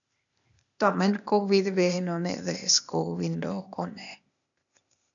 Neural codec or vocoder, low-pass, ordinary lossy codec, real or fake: codec, 16 kHz, 0.8 kbps, ZipCodec; 7.2 kHz; MP3, 96 kbps; fake